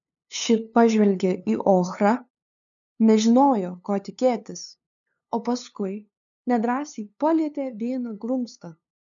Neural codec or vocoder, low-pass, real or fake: codec, 16 kHz, 2 kbps, FunCodec, trained on LibriTTS, 25 frames a second; 7.2 kHz; fake